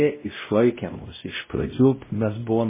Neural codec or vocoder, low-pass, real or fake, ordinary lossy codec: codec, 16 kHz, 0.5 kbps, X-Codec, WavLM features, trained on Multilingual LibriSpeech; 3.6 kHz; fake; MP3, 16 kbps